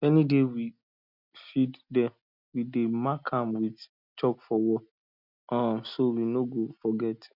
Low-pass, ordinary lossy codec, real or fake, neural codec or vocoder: 5.4 kHz; none; real; none